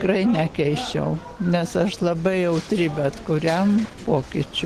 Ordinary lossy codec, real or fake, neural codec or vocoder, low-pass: Opus, 16 kbps; real; none; 14.4 kHz